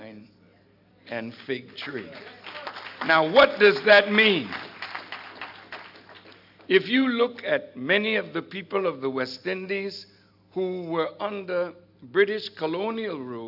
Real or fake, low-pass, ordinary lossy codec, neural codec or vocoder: real; 5.4 kHz; AAC, 48 kbps; none